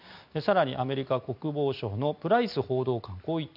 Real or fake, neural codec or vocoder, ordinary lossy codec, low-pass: real; none; none; 5.4 kHz